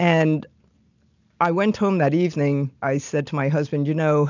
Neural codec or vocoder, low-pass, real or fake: none; 7.2 kHz; real